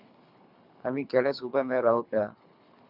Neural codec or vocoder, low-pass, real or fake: codec, 24 kHz, 3 kbps, HILCodec; 5.4 kHz; fake